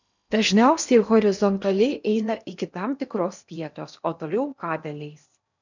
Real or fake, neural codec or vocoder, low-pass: fake; codec, 16 kHz in and 24 kHz out, 0.8 kbps, FocalCodec, streaming, 65536 codes; 7.2 kHz